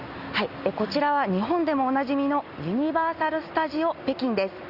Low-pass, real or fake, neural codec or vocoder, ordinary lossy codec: 5.4 kHz; real; none; Opus, 64 kbps